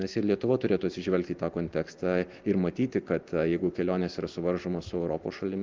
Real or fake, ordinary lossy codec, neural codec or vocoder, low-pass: real; Opus, 32 kbps; none; 7.2 kHz